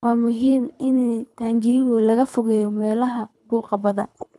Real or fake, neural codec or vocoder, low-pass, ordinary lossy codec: fake; codec, 24 kHz, 3 kbps, HILCodec; none; none